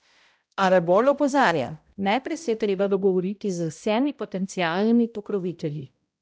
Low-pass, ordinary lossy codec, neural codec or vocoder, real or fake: none; none; codec, 16 kHz, 0.5 kbps, X-Codec, HuBERT features, trained on balanced general audio; fake